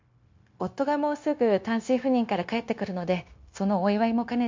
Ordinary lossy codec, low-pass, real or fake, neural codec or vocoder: MP3, 48 kbps; 7.2 kHz; fake; codec, 16 kHz, 0.9 kbps, LongCat-Audio-Codec